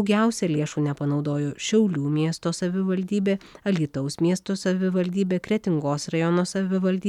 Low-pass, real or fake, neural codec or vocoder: 19.8 kHz; real; none